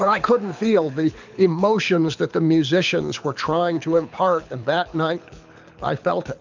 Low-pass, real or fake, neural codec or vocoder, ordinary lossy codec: 7.2 kHz; fake; codec, 24 kHz, 6 kbps, HILCodec; MP3, 64 kbps